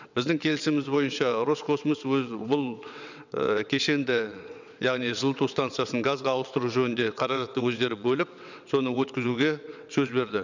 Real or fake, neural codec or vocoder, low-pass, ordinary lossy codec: fake; vocoder, 22.05 kHz, 80 mel bands, WaveNeXt; 7.2 kHz; none